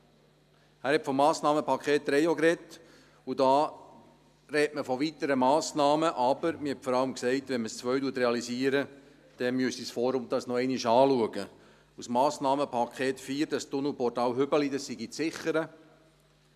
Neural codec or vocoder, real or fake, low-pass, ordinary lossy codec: none; real; 14.4 kHz; none